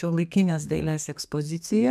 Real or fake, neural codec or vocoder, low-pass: fake; codec, 32 kHz, 1.9 kbps, SNAC; 14.4 kHz